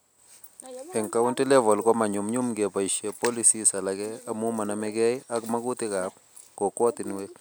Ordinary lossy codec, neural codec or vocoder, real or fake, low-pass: none; none; real; none